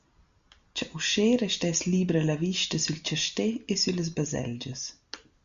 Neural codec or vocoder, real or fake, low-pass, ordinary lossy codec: none; real; 7.2 kHz; Opus, 64 kbps